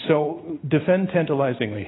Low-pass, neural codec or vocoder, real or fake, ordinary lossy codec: 7.2 kHz; none; real; AAC, 16 kbps